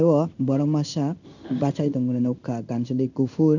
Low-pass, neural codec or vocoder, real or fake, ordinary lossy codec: 7.2 kHz; codec, 16 kHz in and 24 kHz out, 1 kbps, XY-Tokenizer; fake; none